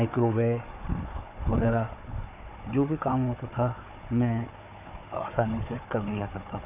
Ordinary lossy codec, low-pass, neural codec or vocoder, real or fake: none; 3.6 kHz; codec, 16 kHz, 4 kbps, FunCodec, trained on Chinese and English, 50 frames a second; fake